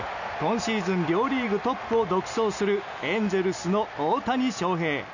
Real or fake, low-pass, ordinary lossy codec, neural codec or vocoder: real; 7.2 kHz; none; none